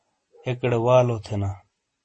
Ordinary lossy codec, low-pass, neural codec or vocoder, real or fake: MP3, 32 kbps; 10.8 kHz; none; real